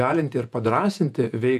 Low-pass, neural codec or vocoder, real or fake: 14.4 kHz; vocoder, 48 kHz, 128 mel bands, Vocos; fake